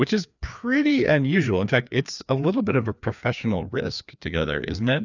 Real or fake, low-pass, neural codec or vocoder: fake; 7.2 kHz; codec, 16 kHz, 2 kbps, FreqCodec, larger model